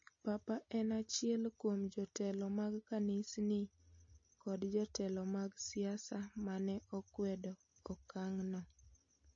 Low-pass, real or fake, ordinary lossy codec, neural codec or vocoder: 7.2 kHz; real; MP3, 32 kbps; none